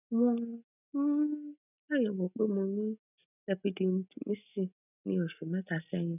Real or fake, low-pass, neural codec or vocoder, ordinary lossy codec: real; 3.6 kHz; none; none